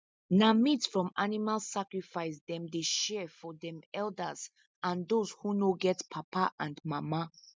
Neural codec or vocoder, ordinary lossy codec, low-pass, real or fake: none; none; none; real